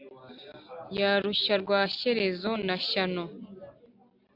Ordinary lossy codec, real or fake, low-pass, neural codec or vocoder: AAC, 48 kbps; real; 5.4 kHz; none